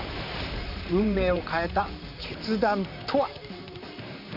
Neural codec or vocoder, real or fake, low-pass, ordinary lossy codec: vocoder, 44.1 kHz, 128 mel bands, Pupu-Vocoder; fake; 5.4 kHz; none